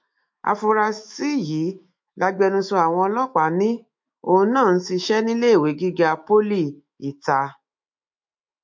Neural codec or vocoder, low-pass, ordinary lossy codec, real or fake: autoencoder, 48 kHz, 128 numbers a frame, DAC-VAE, trained on Japanese speech; 7.2 kHz; MP3, 48 kbps; fake